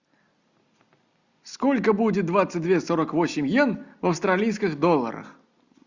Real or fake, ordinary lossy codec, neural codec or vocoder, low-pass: real; Opus, 64 kbps; none; 7.2 kHz